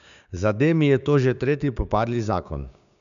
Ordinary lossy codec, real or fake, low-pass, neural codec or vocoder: none; fake; 7.2 kHz; codec, 16 kHz, 6 kbps, DAC